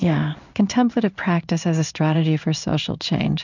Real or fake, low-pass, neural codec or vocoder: fake; 7.2 kHz; codec, 16 kHz in and 24 kHz out, 1 kbps, XY-Tokenizer